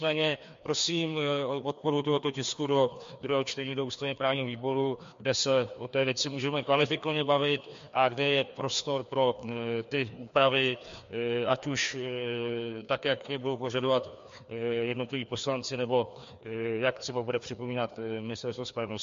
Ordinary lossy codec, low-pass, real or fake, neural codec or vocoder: MP3, 48 kbps; 7.2 kHz; fake; codec, 16 kHz, 2 kbps, FreqCodec, larger model